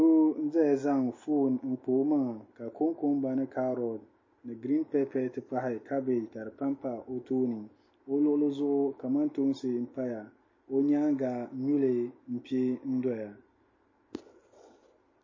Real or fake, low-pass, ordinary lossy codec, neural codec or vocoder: real; 7.2 kHz; MP3, 32 kbps; none